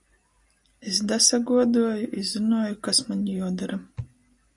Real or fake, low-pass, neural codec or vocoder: real; 10.8 kHz; none